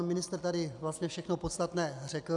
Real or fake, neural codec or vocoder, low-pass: real; none; 10.8 kHz